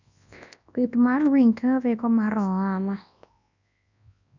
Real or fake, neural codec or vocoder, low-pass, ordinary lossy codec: fake; codec, 24 kHz, 0.9 kbps, WavTokenizer, large speech release; 7.2 kHz; none